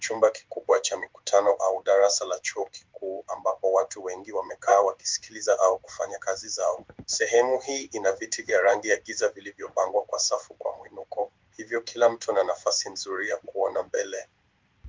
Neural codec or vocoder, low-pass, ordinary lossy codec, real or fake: codec, 16 kHz in and 24 kHz out, 1 kbps, XY-Tokenizer; 7.2 kHz; Opus, 32 kbps; fake